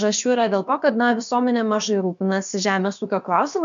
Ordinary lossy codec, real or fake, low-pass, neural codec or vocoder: MP3, 64 kbps; fake; 7.2 kHz; codec, 16 kHz, about 1 kbps, DyCAST, with the encoder's durations